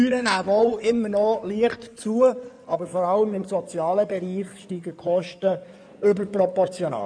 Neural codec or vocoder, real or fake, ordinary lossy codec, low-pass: codec, 16 kHz in and 24 kHz out, 2.2 kbps, FireRedTTS-2 codec; fake; none; 9.9 kHz